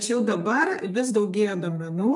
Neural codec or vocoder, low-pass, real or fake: codec, 32 kHz, 1.9 kbps, SNAC; 10.8 kHz; fake